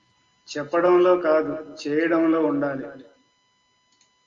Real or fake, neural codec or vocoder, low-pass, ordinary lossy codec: real; none; 7.2 kHz; Opus, 32 kbps